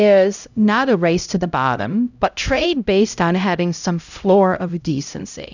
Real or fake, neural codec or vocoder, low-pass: fake; codec, 16 kHz, 0.5 kbps, X-Codec, HuBERT features, trained on LibriSpeech; 7.2 kHz